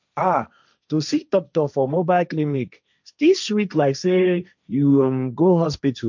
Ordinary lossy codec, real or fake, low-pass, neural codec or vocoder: none; fake; 7.2 kHz; codec, 16 kHz, 1.1 kbps, Voila-Tokenizer